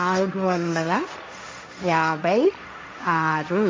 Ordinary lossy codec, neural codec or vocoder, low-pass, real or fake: none; codec, 16 kHz, 1.1 kbps, Voila-Tokenizer; none; fake